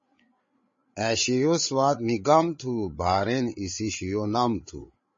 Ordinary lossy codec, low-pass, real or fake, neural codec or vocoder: MP3, 32 kbps; 7.2 kHz; fake; codec, 16 kHz, 8 kbps, FreqCodec, larger model